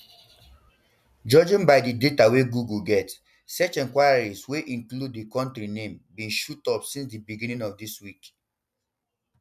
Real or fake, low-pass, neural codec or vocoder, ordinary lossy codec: real; 14.4 kHz; none; none